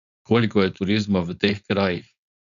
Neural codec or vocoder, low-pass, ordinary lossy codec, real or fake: codec, 16 kHz, 4.8 kbps, FACodec; 7.2 kHz; none; fake